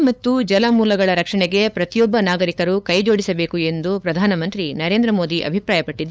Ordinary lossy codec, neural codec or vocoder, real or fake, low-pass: none; codec, 16 kHz, 8 kbps, FunCodec, trained on LibriTTS, 25 frames a second; fake; none